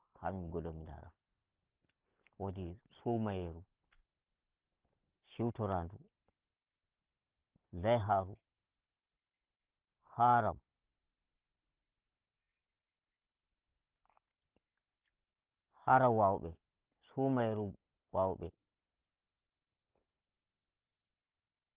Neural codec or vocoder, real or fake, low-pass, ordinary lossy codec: none; real; 3.6 kHz; Opus, 16 kbps